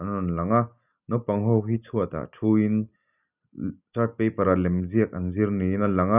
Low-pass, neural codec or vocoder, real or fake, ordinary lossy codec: 3.6 kHz; none; real; none